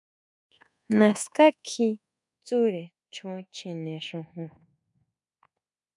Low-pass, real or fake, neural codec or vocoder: 10.8 kHz; fake; codec, 24 kHz, 1.2 kbps, DualCodec